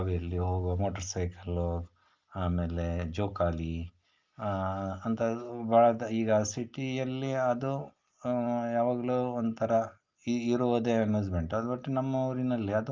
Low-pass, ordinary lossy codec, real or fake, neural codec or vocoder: 7.2 kHz; Opus, 24 kbps; real; none